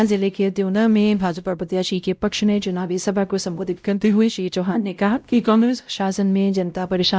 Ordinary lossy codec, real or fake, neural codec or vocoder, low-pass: none; fake; codec, 16 kHz, 0.5 kbps, X-Codec, WavLM features, trained on Multilingual LibriSpeech; none